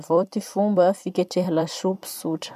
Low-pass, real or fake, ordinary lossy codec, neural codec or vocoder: 14.4 kHz; fake; none; vocoder, 44.1 kHz, 128 mel bands every 256 samples, BigVGAN v2